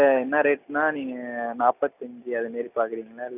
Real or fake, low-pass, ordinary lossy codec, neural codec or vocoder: real; 3.6 kHz; none; none